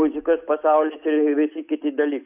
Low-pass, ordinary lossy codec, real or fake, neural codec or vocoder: 3.6 kHz; AAC, 32 kbps; real; none